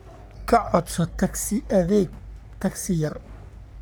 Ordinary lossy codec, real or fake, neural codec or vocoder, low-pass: none; fake; codec, 44.1 kHz, 3.4 kbps, Pupu-Codec; none